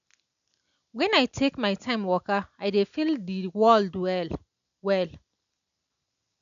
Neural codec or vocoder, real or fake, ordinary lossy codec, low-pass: none; real; none; 7.2 kHz